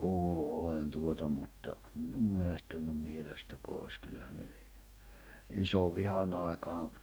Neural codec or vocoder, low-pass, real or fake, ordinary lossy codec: codec, 44.1 kHz, 2.6 kbps, DAC; none; fake; none